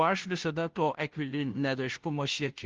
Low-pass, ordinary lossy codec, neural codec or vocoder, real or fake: 7.2 kHz; Opus, 16 kbps; codec, 16 kHz, 0.5 kbps, FunCodec, trained on Chinese and English, 25 frames a second; fake